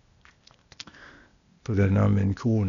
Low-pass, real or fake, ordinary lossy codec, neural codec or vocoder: 7.2 kHz; fake; none; codec, 16 kHz, 0.8 kbps, ZipCodec